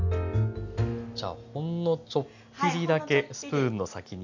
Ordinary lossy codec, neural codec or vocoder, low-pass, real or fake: none; none; 7.2 kHz; real